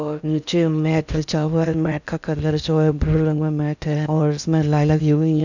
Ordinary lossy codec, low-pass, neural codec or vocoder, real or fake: none; 7.2 kHz; codec, 16 kHz in and 24 kHz out, 0.6 kbps, FocalCodec, streaming, 4096 codes; fake